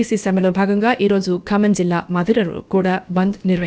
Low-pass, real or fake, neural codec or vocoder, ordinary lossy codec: none; fake; codec, 16 kHz, about 1 kbps, DyCAST, with the encoder's durations; none